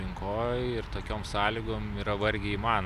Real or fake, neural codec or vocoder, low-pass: real; none; 14.4 kHz